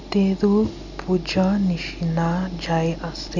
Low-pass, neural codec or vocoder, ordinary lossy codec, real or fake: 7.2 kHz; none; none; real